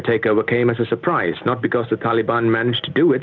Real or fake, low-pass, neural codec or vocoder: real; 7.2 kHz; none